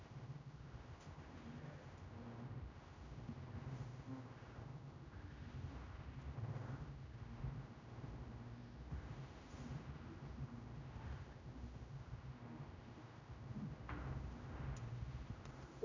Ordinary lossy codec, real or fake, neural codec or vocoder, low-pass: MP3, 64 kbps; fake; codec, 16 kHz, 0.5 kbps, X-Codec, HuBERT features, trained on general audio; 7.2 kHz